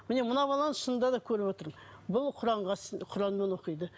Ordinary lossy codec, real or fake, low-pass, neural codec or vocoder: none; real; none; none